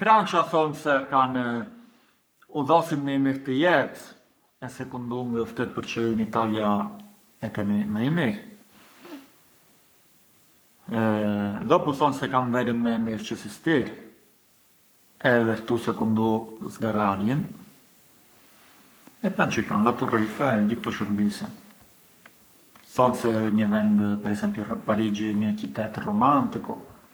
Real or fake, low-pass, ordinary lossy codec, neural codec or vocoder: fake; none; none; codec, 44.1 kHz, 3.4 kbps, Pupu-Codec